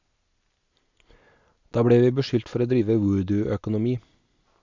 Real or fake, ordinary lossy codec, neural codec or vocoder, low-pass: real; MP3, 64 kbps; none; 7.2 kHz